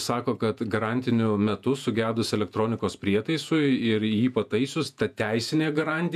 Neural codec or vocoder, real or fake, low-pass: vocoder, 48 kHz, 128 mel bands, Vocos; fake; 14.4 kHz